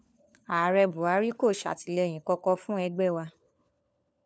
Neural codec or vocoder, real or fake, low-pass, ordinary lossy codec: codec, 16 kHz, 4 kbps, FunCodec, trained on LibriTTS, 50 frames a second; fake; none; none